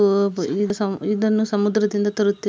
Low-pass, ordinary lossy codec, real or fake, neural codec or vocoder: none; none; real; none